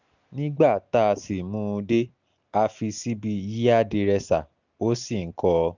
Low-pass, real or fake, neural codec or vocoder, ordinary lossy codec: 7.2 kHz; real; none; none